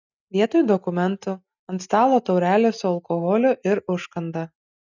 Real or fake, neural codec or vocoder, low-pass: real; none; 7.2 kHz